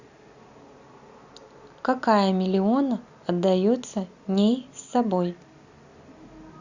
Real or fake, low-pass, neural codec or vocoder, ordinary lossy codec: real; 7.2 kHz; none; Opus, 64 kbps